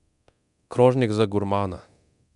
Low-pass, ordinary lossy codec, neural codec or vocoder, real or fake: 10.8 kHz; none; codec, 24 kHz, 0.9 kbps, DualCodec; fake